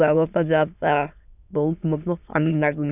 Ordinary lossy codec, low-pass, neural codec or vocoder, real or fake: none; 3.6 kHz; autoencoder, 22.05 kHz, a latent of 192 numbers a frame, VITS, trained on many speakers; fake